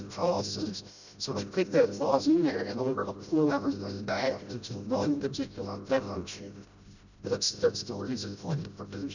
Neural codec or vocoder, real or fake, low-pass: codec, 16 kHz, 0.5 kbps, FreqCodec, smaller model; fake; 7.2 kHz